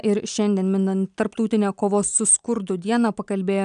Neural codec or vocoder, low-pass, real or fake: none; 9.9 kHz; real